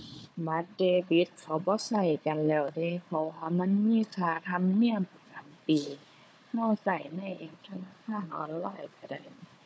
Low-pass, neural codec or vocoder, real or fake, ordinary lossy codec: none; codec, 16 kHz, 4 kbps, FunCodec, trained on Chinese and English, 50 frames a second; fake; none